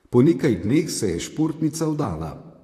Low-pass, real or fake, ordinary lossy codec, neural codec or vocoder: 14.4 kHz; fake; AAC, 64 kbps; vocoder, 44.1 kHz, 128 mel bands, Pupu-Vocoder